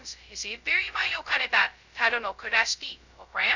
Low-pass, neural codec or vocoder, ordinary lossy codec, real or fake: 7.2 kHz; codec, 16 kHz, 0.2 kbps, FocalCodec; none; fake